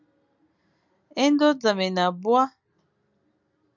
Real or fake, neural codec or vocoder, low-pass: real; none; 7.2 kHz